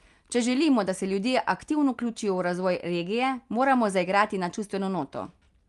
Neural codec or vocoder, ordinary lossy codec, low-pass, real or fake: none; Opus, 32 kbps; 10.8 kHz; real